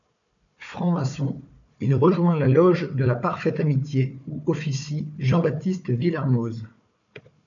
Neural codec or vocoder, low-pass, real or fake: codec, 16 kHz, 4 kbps, FunCodec, trained on Chinese and English, 50 frames a second; 7.2 kHz; fake